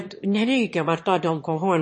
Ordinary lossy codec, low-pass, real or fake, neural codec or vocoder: MP3, 32 kbps; 9.9 kHz; fake; autoencoder, 22.05 kHz, a latent of 192 numbers a frame, VITS, trained on one speaker